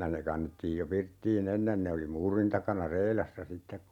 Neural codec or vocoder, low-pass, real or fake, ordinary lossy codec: none; 19.8 kHz; real; none